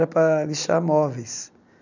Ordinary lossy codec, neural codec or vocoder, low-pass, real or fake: none; none; 7.2 kHz; real